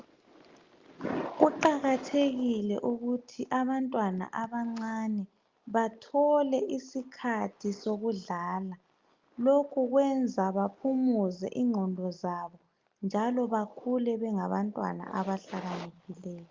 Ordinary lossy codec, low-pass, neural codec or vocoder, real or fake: Opus, 32 kbps; 7.2 kHz; none; real